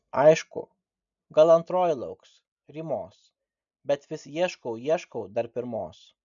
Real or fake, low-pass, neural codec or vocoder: real; 7.2 kHz; none